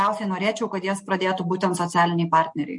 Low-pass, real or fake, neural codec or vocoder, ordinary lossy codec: 10.8 kHz; real; none; MP3, 48 kbps